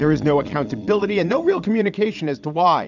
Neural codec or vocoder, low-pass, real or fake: vocoder, 22.05 kHz, 80 mel bands, Vocos; 7.2 kHz; fake